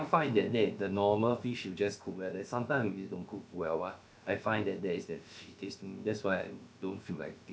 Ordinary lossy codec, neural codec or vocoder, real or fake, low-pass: none; codec, 16 kHz, about 1 kbps, DyCAST, with the encoder's durations; fake; none